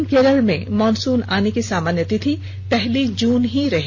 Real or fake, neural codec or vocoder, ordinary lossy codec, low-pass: real; none; none; none